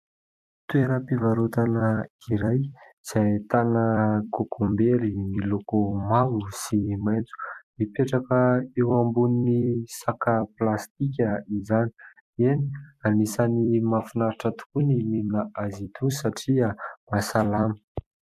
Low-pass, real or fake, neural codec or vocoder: 14.4 kHz; fake; vocoder, 44.1 kHz, 128 mel bands every 256 samples, BigVGAN v2